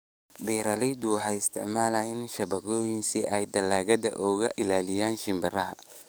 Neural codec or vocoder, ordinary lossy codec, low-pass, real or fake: codec, 44.1 kHz, 7.8 kbps, DAC; none; none; fake